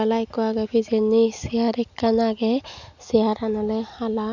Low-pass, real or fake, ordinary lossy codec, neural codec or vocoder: 7.2 kHz; real; none; none